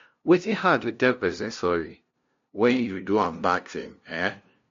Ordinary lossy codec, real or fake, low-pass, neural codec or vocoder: MP3, 48 kbps; fake; 7.2 kHz; codec, 16 kHz, 0.5 kbps, FunCodec, trained on LibriTTS, 25 frames a second